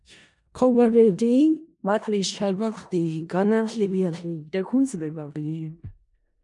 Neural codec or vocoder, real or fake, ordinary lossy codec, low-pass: codec, 16 kHz in and 24 kHz out, 0.4 kbps, LongCat-Audio-Codec, four codebook decoder; fake; MP3, 96 kbps; 10.8 kHz